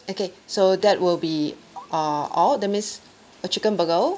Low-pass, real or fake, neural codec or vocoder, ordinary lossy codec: none; real; none; none